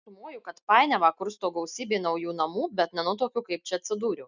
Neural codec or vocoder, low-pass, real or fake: none; 7.2 kHz; real